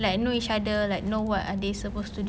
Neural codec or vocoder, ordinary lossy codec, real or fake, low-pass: none; none; real; none